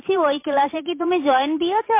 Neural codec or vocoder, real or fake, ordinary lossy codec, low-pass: vocoder, 44.1 kHz, 128 mel bands every 512 samples, BigVGAN v2; fake; MP3, 24 kbps; 3.6 kHz